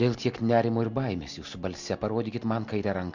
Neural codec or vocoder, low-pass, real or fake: none; 7.2 kHz; real